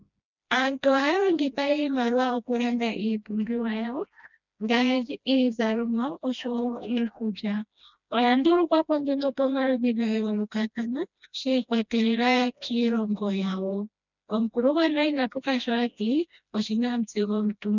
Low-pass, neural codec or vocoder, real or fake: 7.2 kHz; codec, 16 kHz, 1 kbps, FreqCodec, smaller model; fake